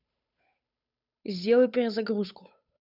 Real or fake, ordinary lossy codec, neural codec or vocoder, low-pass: fake; none; codec, 16 kHz, 8 kbps, FunCodec, trained on Chinese and English, 25 frames a second; 5.4 kHz